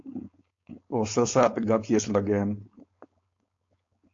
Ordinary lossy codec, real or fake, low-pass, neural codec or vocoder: AAC, 48 kbps; fake; 7.2 kHz; codec, 16 kHz, 4.8 kbps, FACodec